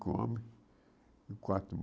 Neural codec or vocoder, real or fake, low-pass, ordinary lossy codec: none; real; none; none